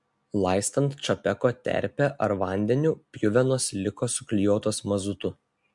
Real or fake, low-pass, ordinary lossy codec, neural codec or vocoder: real; 10.8 kHz; MP3, 64 kbps; none